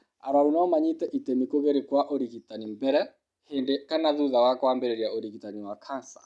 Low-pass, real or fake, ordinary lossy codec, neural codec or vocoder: none; real; none; none